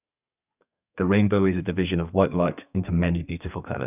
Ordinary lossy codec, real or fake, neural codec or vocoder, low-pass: none; fake; codec, 32 kHz, 1.9 kbps, SNAC; 3.6 kHz